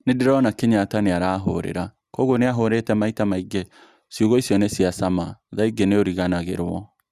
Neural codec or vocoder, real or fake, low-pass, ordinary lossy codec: none; real; 14.4 kHz; Opus, 64 kbps